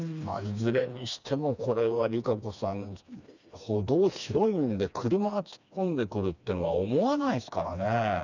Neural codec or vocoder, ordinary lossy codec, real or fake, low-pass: codec, 16 kHz, 2 kbps, FreqCodec, smaller model; none; fake; 7.2 kHz